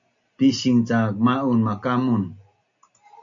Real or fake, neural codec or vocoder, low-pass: real; none; 7.2 kHz